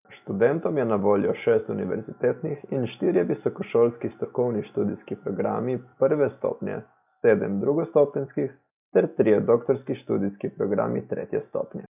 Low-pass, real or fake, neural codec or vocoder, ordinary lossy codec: 3.6 kHz; real; none; none